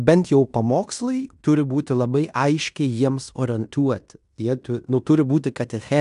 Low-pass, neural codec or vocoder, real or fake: 10.8 kHz; codec, 16 kHz in and 24 kHz out, 0.9 kbps, LongCat-Audio-Codec, fine tuned four codebook decoder; fake